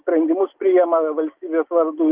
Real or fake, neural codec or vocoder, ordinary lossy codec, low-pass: real; none; Opus, 24 kbps; 3.6 kHz